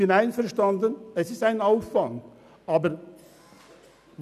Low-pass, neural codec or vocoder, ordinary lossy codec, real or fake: 14.4 kHz; none; none; real